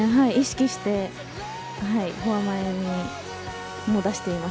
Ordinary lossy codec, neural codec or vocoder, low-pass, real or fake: none; none; none; real